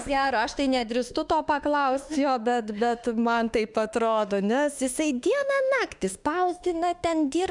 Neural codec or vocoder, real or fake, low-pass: autoencoder, 48 kHz, 32 numbers a frame, DAC-VAE, trained on Japanese speech; fake; 10.8 kHz